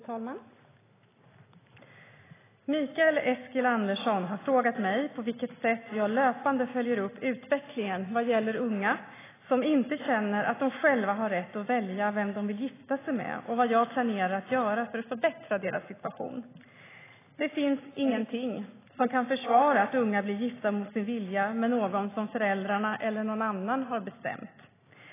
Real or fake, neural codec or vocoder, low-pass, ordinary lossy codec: real; none; 3.6 kHz; AAC, 16 kbps